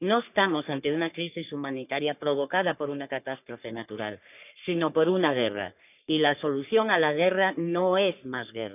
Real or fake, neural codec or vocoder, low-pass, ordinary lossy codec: fake; codec, 44.1 kHz, 3.4 kbps, Pupu-Codec; 3.6 kHz; none